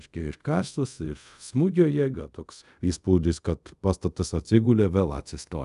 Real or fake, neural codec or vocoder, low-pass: fake; codec, 24 kHz, 0.5 kbps, DualCodec; 10.8 kHz